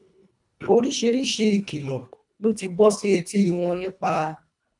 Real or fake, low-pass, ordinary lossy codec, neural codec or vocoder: fake; 10.8 kHz; none; codec, 24 kHz, 1.5 kbps, HILCodec